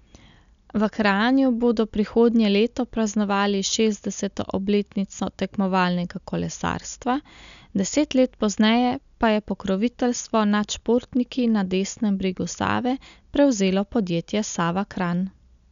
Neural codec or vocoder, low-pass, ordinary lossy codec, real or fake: none; 7.2 kHz; none; real